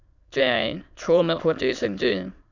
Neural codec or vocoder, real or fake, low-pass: autoencoder, 22.05 kHz, a latent of 192 numbers a frame, VITS, trained on many speakers; fake; 7.2 kHz